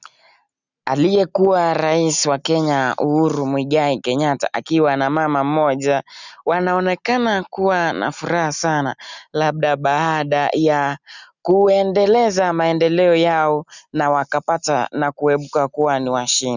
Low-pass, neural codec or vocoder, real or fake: 7.2 kHz; none; real